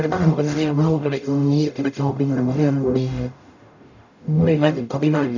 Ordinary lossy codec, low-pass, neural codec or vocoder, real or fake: none; 7.2 kHz; codec, 44.1 kHz, 0.9 kbps, DAC; fake